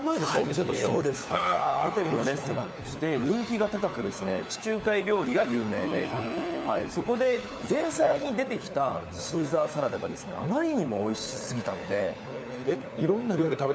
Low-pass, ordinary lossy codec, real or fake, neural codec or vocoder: none; none; fake; codec, 16 kHz, 4 kbps, FunCodec, trained on LibriTTS, 50 frames a second